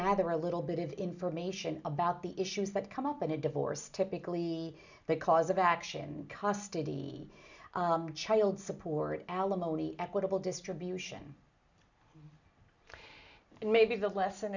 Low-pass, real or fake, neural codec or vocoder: 7.2 kHz; real; none